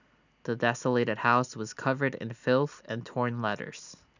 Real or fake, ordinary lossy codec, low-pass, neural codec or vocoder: real; none; 7.2 kHz; none